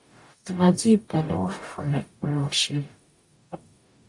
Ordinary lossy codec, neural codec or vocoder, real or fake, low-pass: AAC, 64 kbps; codec, 44.1 kHz, 0.9 kbps, DAC; fake; 10.8 kHz